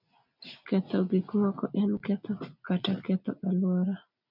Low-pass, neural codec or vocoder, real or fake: 5.4 kHz; none; real